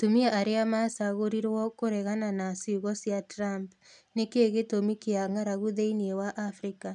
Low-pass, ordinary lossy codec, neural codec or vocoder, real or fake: 10.8 kHz; none; none; real